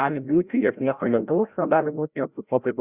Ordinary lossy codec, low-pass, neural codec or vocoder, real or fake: Opus, 64 kbps; 3.6 kHz; codec, 16 kHz, 0.5 kbps, FreqCodec, larger model; fake